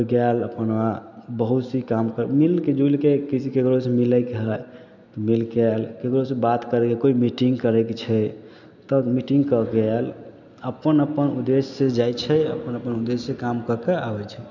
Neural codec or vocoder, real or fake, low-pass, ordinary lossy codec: none; real; 7.2 kHz; none